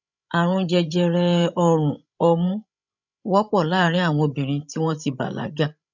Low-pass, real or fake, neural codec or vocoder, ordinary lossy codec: 7.2 kHz; fake; codec, 16 kHz, 16 kbps, FreqCodec, larger model; none